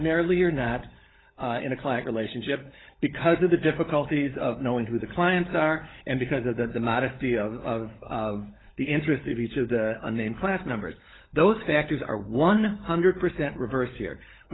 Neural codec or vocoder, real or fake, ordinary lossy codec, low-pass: codec, 16 kHz, 16 kbps, FunCodec, trained on LibriTTS, 50 frames a second; fake; AAC, 16 kbps; 7.2 kHz